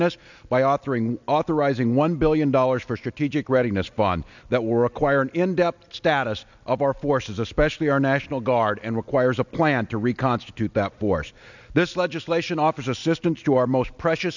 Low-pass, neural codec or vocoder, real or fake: 7.2 kHz; none; real